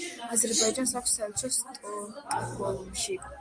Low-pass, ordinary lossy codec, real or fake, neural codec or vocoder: 9.9 kHz; Opus, 32 kbps; real; none